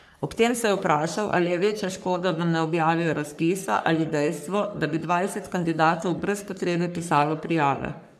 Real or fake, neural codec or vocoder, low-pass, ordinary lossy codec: fake; codec, 44.1 kHz, 3.4 kbps, Pupu-Codec; 14.4 kHz; none